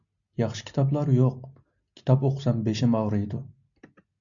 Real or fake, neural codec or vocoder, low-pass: real; none; 7.2 kHz